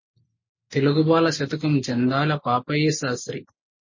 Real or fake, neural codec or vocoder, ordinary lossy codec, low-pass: real; none; MP3, 32 kbps; 7.2 kHz